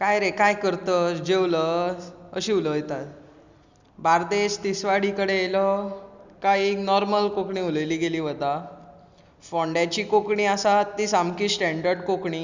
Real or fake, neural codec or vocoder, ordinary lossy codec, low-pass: real; none; Opus, 64 kbps; 7.2 kHz